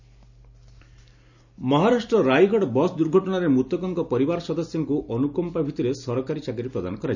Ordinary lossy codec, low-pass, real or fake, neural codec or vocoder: none; 7.2 kHz; real; none